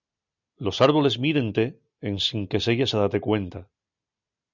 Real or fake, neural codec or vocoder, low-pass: real; none; 7.2 kHz